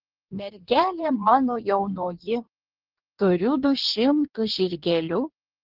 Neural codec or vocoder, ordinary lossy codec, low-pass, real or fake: codec, 16 kHz in and 24 kHz out, 1.1 kbps, FireRedTTS-2 codec; Opus, 16 kbps; 5.4 kHz; fake